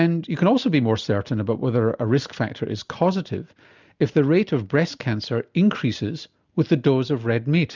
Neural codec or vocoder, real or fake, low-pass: none; real; 7.2 kHz